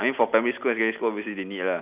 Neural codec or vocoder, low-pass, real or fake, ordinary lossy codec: none; 3.6 kHz; real; none